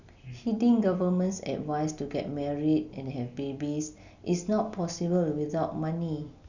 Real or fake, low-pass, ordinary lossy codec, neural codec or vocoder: real; 7.2 kHz; none; none